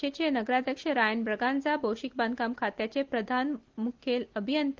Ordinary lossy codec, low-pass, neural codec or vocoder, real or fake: Opus, 16 kbps; 7.2 kHz; none; real